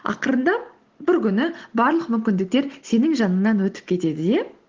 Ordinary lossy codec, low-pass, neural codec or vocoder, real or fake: Opus, 16 kbps; 7.2 kHz; none; real